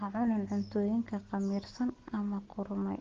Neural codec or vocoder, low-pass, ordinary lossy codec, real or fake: none; 7.2 kHz; Opus, 16 kbps; real